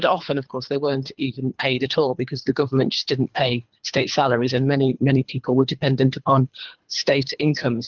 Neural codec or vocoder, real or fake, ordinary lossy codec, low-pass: codec, 24 kHz, 3 kbps, HILCodec; fake; Opus, 32 kbps; 7.2 kHz